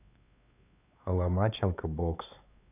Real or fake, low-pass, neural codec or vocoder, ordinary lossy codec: fake; 3.6 kHz; codec, 16 kHz, 4 kbps, X-Codec, WavLM features, trained on Multilingual LibriSpeech; none